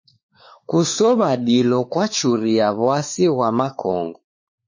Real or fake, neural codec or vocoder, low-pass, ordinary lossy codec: fake; codec, 16 kHz, 4 kbps, X-Codec, WavLM features, trained on Multilingual LibriSpeech; 7.2 kHz; MP3, 32 kbps